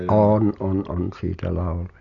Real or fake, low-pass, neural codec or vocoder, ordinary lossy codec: real; 7.2 kHz; none; MP3, 96 kbps